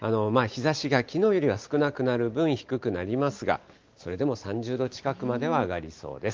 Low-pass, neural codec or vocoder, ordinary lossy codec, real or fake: 7.2 kHz; none; Opus, 32 kbps; real